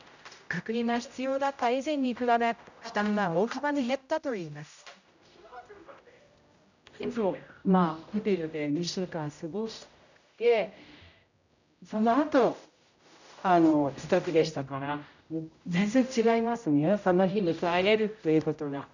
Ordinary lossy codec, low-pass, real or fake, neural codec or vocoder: none; 7.2 kHz; fake; codec, 16 kHz, 0.5 kbps, X-Codec, HuBERT features, trained on general audio